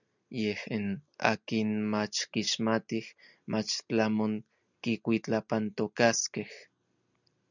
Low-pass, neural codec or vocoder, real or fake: 7.2 kHz; none; real